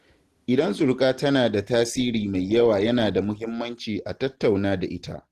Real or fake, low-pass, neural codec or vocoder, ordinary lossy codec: real; 14.4 kHz; none; Opus, 16 kbps